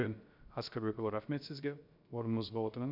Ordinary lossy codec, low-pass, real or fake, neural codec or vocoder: none; 5.4 kHz; fake; codec, 16 kHz, 0.3 kbps, FocalCodec